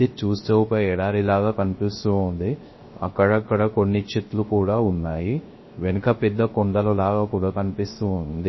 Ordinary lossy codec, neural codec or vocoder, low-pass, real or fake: MP3, 24 kbps; codec, 16 kHz, 0.3 kbps, FocalCodec; 7.2 kHz; fake